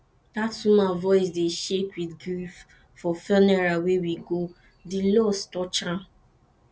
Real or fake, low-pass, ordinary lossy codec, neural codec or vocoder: real; none; none; none